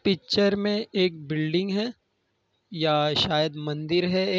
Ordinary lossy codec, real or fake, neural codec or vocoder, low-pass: none; real; none; none